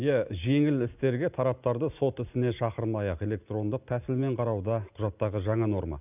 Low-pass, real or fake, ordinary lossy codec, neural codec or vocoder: 3.6 kHz; real; none; none